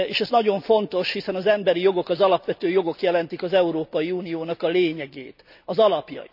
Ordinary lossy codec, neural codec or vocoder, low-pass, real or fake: none; none; 5.4 kHz; real